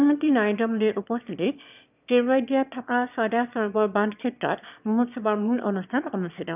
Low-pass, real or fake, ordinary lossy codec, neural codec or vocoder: 3.6 kHz; fake; none; autoencoder, 22.05 kHz, a latent of 192 numbers a frame, VITS, trained on one speaker